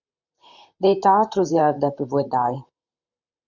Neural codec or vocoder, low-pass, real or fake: vocoder, 44.1 kHz, 128 mel bands, Pupu-Vocoder; 7.2 kHz; fake